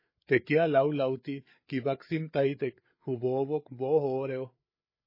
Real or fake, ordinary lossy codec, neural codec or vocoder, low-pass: fake; MP3, 24 kbps; codec, 16 kHz, 8 kbps, FreqCodec, larger model; 5.4 kHz